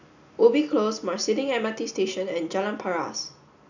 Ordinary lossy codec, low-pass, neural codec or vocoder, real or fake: none; 7.2 kHz; none; real